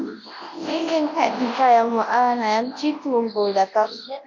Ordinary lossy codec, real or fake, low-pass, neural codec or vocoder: MP3, 48 kbps; fake; 7.2 kHz; codec, 24 kHz, 0.9 kbps, WavTokenizer, large speech release